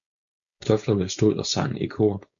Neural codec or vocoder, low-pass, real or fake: codec, 16 kHz, 4.8 kbps, FACodec; 7.2 kHz; fake